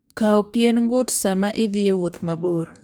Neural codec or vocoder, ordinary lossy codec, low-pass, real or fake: codec, 44.1 kHz, 2.6 kbps, DAC; none; none; fake